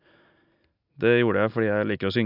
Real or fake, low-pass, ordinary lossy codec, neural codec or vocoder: real; 5.4 kHz; none; none